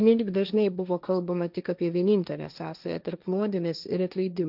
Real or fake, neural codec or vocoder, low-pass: fake; codec, 16 kHz, 1.1 kbps, Voila-Tokenizer; 5.4 kHz